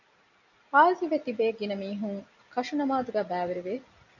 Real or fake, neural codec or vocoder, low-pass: real; none; 7.2 kHz